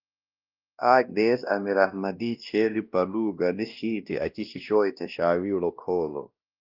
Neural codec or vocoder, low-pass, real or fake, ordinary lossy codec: codec, 16 kHz, 1 kbps, X-Codec, WavLM features, trained on Multilingual LibriSpeech; 5.4 kHz; fake; Opus, 32 kbps